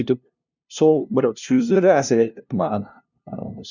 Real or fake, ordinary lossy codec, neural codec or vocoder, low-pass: fake; none; codec, 16 kHz, 0.5 kbps, FunCodec, trained on LibriTTS, 25 frames a second; 7.2 kHz